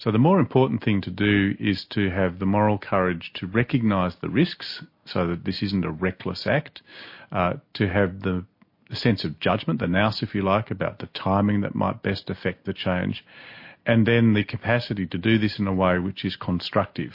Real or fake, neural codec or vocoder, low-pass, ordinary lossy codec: real; none; 5.4 kHz; MP3, 32 kbps